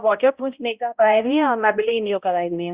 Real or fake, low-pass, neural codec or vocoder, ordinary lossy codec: fake; 3.6 kHz; codec, 16 kHz, 0.5 kbps, X-Codec, HuBERT features, trained on balanced general audio; Opus, 64 kbps